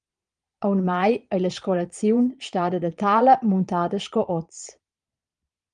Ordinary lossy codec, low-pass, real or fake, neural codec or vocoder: Opus, 32 kbps; 9.9 kHz; fake; vocoder, 22.05 kHz, 80 mel bands, Vocos